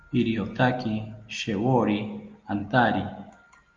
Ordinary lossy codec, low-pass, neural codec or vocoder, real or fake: Opus, 32 kbps; 7.2 kHz; none; real